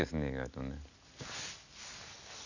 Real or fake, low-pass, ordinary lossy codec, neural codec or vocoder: real; 7.2 kHz; none; none